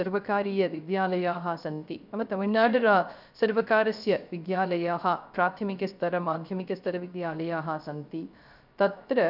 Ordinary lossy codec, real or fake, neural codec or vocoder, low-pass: none; fake; codec, 16 kHz, 0.3 kbps, FocalCodec; 5.4 kHz